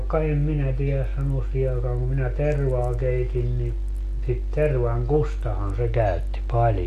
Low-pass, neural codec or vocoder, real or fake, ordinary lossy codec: 14.4 kHz; none; real; AAC, 64 kbps